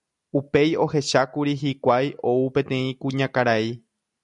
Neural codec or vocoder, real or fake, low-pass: none; real; 10.8 kHz